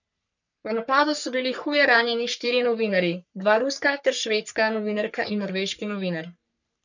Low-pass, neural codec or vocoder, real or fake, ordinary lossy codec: 7.2 kHz; codec, 44.1 kHz, 3.4 kbps, Pupu-Codec; fake; none